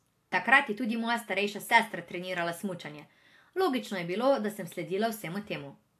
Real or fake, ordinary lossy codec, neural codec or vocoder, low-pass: real; AAC, 64 kbps; none; 14.4 kHz